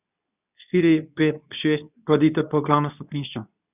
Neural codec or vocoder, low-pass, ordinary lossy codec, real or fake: codec, 24 kHz, 0.9 kbps, WavTokenizer, medium speech release version 2; 3.6 kHz; none; fake